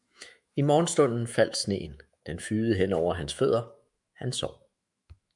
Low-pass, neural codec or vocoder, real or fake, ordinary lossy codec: 10.8 kHz; autoencoder, 48 kHz, 128 numbers a frame, DAC-VAE, trained on Japanese speech; fake; MP3, 96 kbps